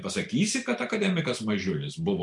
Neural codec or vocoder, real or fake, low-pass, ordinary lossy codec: none; real; 10.8 kHz; MP3, 96 kbps